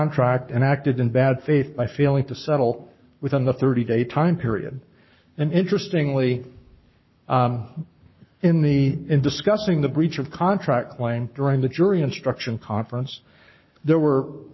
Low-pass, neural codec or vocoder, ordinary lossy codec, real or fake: 7.2 kHz; codec, 44.1 kHz, 7.8 kbps, Pupu-Codec; MP3, 24 kbps; fake